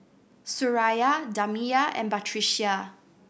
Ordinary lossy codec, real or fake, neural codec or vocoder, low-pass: none; real; none; none